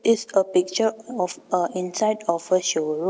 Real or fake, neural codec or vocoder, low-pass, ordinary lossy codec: real; none; none; none